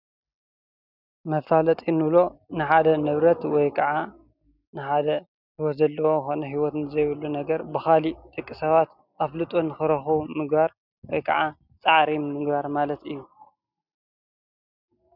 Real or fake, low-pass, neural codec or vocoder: real; 5.4 kHz; none